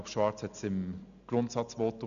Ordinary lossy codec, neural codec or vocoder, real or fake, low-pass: none; none; real; 7.2 kHz